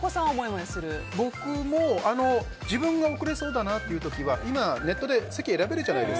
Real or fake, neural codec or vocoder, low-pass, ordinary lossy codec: real; none; none; none